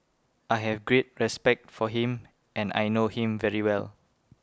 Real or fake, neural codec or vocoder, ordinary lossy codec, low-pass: real; none; none; none